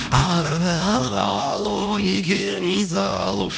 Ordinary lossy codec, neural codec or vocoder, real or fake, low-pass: none; codec, 16 kHz, 1 kbps, X-Codec, HuBERT features, trained on LibriSpeech; fake; none